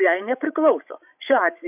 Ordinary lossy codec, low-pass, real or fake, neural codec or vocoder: AAC, 32 kbps; 3.6 kHz; fake; codec, 16 kHz, 16 kbps, FreqCodec, larger model